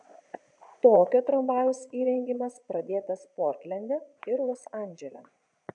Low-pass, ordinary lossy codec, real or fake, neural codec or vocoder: 9.9 kHz; MP3, 64 kbps; fake; vocoder, 22.05 kHz, 80 mel bands, Vocos